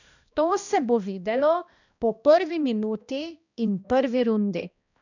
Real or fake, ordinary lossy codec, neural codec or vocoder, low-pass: fake; none; codec, 16 kHz, 1 kbps, X-Codec, HuBERT features, trained on balanced general audio; 7.2 kHz